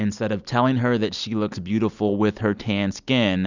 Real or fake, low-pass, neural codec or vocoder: real; 7.2 kHz; none